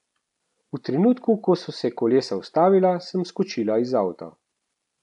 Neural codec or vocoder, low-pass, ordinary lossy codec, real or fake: none; 10.8 kHz; none; real